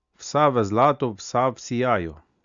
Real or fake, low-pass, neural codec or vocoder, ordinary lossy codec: real; 7.2 kHz; none; Opus, 64 kbps